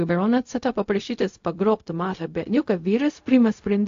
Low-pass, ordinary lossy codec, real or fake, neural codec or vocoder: 7.2 kHz; AAC, 48 kbps; fake; codec, 16 kHz, 0.4 kbps, LongCat-Audio-Codec